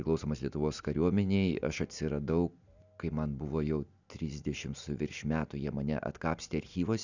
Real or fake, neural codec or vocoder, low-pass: real; none; 7.2 kHz